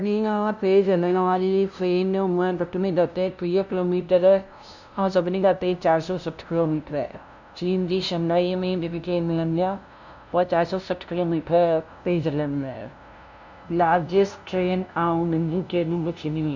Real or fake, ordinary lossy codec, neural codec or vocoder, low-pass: fake; none; codec, 16 kHz, 0.5 kbps, FunCodec, trained on LibriTTS, 25 frames a second; 7.2 kHz